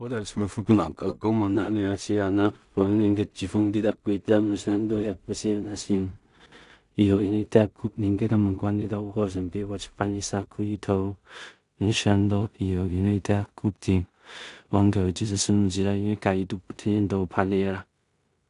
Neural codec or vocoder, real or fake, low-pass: codec, 16 kHz in and 24 kHz out, 0.4 kbps, LongCat-Audio-Codec, two codebook decoder; fake; 10.8 kHz